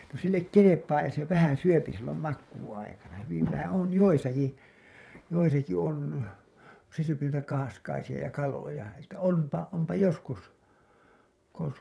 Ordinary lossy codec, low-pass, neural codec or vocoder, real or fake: none; none; vocoder, 22.05 kHz, 80 mel bands, WaveNeXt; fake